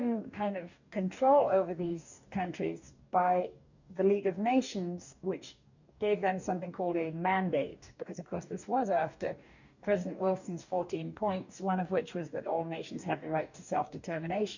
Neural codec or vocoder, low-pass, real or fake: codec, 44.1 kHz, 2.6 kbps, DAC; 7.2 kHz; fake